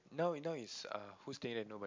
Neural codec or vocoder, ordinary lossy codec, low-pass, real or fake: none; MP3, 64 kbps; 7.2 kHz; real